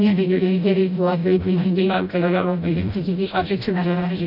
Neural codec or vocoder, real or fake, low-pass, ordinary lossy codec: codec, 16 kHz, 0.5 kbps, FreqCodec, smaller model; fake; 5.4 kHz; none